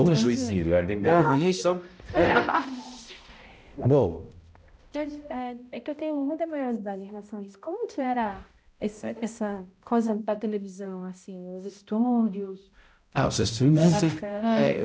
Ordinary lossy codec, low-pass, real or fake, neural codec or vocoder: none; none; fake; codec, 16 kHz, 0.5 kbps, X-Codec, HuBERT features, trained on balanced general audio